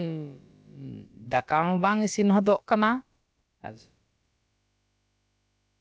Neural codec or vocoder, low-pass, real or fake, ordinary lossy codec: codec, 16 kHz, about 1 kbps, DyCAST, with the encoder's durations; none; fake; none